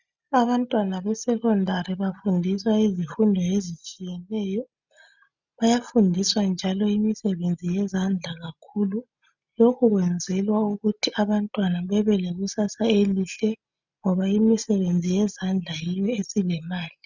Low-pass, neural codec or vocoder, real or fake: 7.2 kHz; none; real